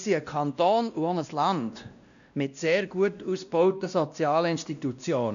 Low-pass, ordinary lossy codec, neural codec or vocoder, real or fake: 7.2 kHz; none; codec, 16 kHz, 1 kbps, X-Codec, WavLM features, trained on Multilingual LibriSpeech; fake